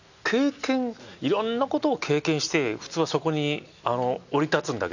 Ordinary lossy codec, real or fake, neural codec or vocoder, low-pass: none; real; none; 7.2 kHz